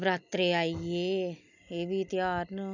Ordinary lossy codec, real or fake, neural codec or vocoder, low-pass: none; real; none; 7.2 kHz